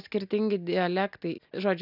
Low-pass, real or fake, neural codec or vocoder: 5.4 kHz; real; none